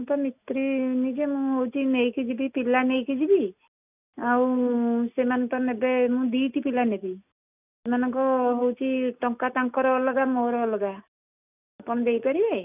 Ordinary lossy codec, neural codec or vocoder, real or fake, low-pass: none; none; real; 3.6 kHz